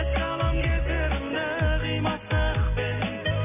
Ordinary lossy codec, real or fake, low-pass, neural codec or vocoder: none; real; 3.6 kHz; none